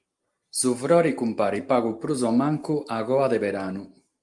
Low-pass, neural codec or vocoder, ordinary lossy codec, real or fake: 10.8 kHz; none; Opus, 32 kbps; real